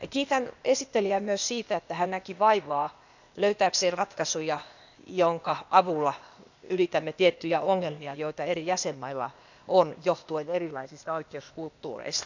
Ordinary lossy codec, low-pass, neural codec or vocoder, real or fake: none; 7.2 kHz; codec, 16 kHz, 0.8 kbps, ZipCodec; fake